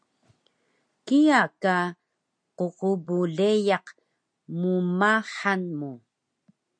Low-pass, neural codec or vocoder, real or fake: 9.9 kHz; none; real